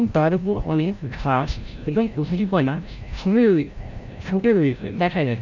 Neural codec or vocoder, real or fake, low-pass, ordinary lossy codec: codec, 16 kHz, 0.5 kbps, FreqCodec, larger model; fake; 7.2 kHz; none